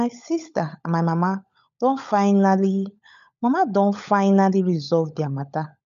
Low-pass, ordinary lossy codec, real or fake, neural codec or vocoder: 7.2 kHz; none; fake; codec, 16 kHz, 16 kbps, FunCodec, trained on LibriTTS, 50 frames a second